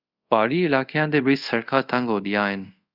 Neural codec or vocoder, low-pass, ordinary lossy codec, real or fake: codec, 24 kHz, 0.5 kbps, DualCodec; 5.4 kHz; Opus, 64 kbps; fake